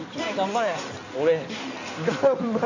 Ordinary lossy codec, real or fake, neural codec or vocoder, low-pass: none; fake; codec, 16 kHz in and 24 kHz out, 2.2 kbps, FireRedTTS-2 codec; 7.2 kHz